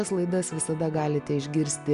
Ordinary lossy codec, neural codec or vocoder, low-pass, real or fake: Opus, 64 kbps; none; 10.8 kHz; real